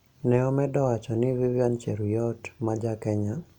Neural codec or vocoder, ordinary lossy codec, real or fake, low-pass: none; none; real; 19.8 kHz